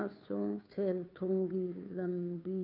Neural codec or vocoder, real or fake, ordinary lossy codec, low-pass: codec, 16 kHz, 2 kbps, FunCodec, trained on Chinese and English, 25 frames a second; fake; none; 5.4 kHz